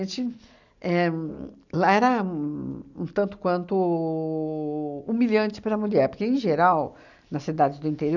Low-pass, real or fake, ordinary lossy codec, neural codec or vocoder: 7.2 kHz; real; none; none